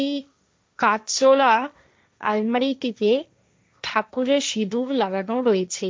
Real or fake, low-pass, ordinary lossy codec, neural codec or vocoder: fake; none; none; codec, 16 kHz, 1.1 kbps, Voila-Tokenizer